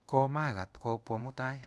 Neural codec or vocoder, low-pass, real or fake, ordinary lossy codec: codec, 24 kHz, 0.5 kbps, DualCodec; none; fake; none